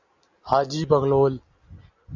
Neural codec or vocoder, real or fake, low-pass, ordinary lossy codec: none; real; 7.2 kHz; Opus, 64 kbps